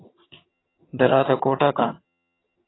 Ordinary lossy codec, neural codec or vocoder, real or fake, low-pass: AAC, 16 kbps; vocoder, 22.05 kHz, 80 mel bands, HiFi-GAN; fake; 7.2 kHz